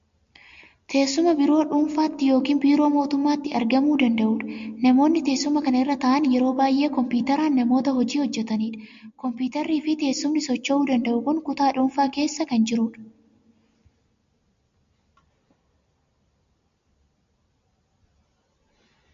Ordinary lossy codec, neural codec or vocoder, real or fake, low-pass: MP3, 48 kbps; none; real; 7.2 kHz